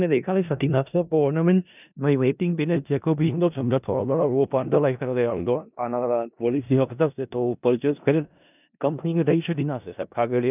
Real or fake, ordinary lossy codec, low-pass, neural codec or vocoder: fake; none; 3.6 kHz; codec, 16 kHz in and 24 kHz out, 0.4 kbps, LongCat-Audio-Codec, four codebook decoder